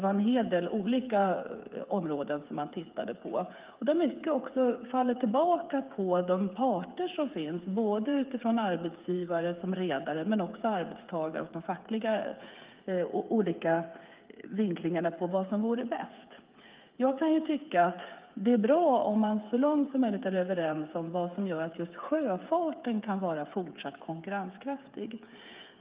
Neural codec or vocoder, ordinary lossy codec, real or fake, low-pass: codec, 16 kHz, 8 kbps, FreqCodec, smaller model; Opus, 32 kbps; fake; 3.6 kHz